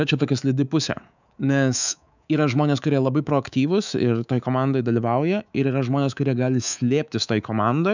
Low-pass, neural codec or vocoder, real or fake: 7.2 kHz; codec, 24 kHz, 3.1 kbps, DualCodec; fake